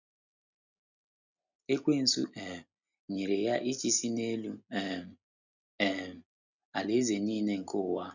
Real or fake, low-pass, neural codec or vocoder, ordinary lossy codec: real; 7.2 kHz; none; none